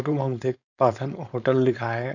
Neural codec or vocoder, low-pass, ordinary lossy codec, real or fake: codec, 16 kHz, 4.8 kbps, FACodec; 7.2 kHz; none; fake